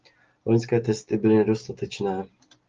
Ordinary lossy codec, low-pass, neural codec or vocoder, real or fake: Opus, 32 kbps; 7.2 kHz; none; real